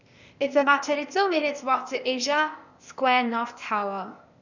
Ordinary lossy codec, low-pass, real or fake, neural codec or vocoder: none; 7.2 kHz; fake; codec, 16 kHz, 0.8 kbps, ZipCodec